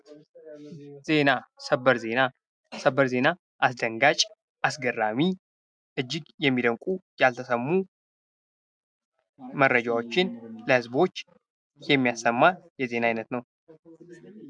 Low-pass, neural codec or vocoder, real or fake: 9.9 kHz; none; real